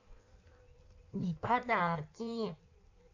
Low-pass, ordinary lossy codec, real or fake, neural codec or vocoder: 7.2 kHz; none; fake; codec, 16 kHz in and 24 kHz out, 1.1 kbps, FireRedTTS-2 codec